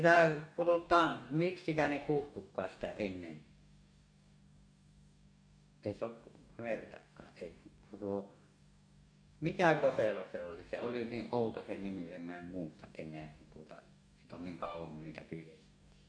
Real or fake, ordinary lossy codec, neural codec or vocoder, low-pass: fake; none; codec, 44.1 kHz, 2.6 kbps, DAC; 9.9 kHz